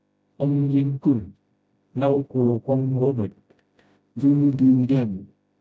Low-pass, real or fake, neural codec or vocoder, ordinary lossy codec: none; fake; codec, 16 kHz, 0.5 kbps, FreqCodec, smaller model; none